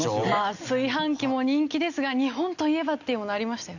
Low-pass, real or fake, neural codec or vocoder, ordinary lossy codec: 7.2 kHz; real; none; none